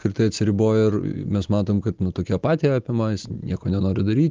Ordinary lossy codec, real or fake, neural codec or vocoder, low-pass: Opus, 24 kbps; real; none; 7.2 kHz